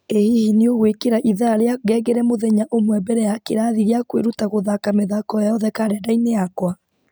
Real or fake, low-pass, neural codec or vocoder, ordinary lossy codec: real; none; none; none